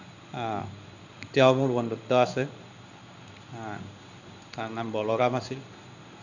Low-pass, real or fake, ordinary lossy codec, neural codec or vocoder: 7.2 kHz; fake; none; codec, 16 kHz in and 24 kHz out, 1 kbps, XY-Tokenizer